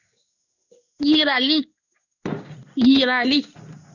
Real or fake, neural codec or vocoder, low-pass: fake; codec, 16 kHz in and 24 kHz out, 1 kbps, XY-Tokenizer; 7.2 kHz